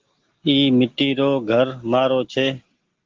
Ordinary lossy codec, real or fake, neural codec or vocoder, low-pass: Opus, 16 kbps; real; none; 7.2 kHz